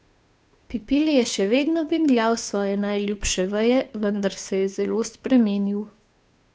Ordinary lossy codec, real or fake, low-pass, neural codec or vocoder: none; fake; none; codec, 16 kHz, 2 kbps, FunCodec, trained on Chinese and English, 25 frames a second